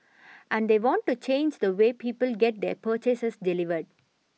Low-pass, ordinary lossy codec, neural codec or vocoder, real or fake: none; none; none; real